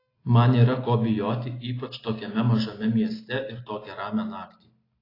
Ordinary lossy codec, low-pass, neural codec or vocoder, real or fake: AAC, 24 kbps; 5.4 kHz; none; real